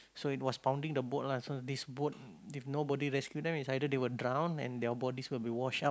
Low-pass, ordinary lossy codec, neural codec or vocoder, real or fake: none; none; none; real